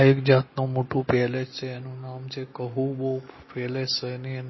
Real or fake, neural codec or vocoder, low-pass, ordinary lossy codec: real; none; 7.2 kHz; MP3, 24 kbps